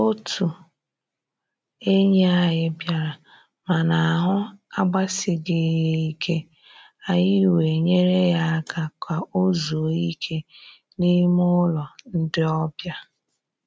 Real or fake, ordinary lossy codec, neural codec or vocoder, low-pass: real; none; none; none